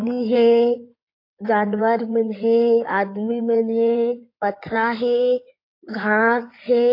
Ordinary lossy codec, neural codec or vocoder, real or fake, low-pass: AAC, 32 kbps; codec, 16 kHz in and 24 kHz out, 2.2 kbps, FireRedTTS-2 codec; fake; 5.4 kHz